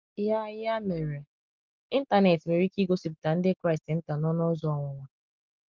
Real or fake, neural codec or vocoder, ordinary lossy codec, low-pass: real; none; Opus, 24 kbps; 7.2 kHz